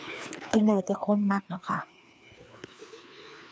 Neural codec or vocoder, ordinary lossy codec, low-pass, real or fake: codec, 16 kHz, 2 kbps, FreqCodec, larger model; none; none; fake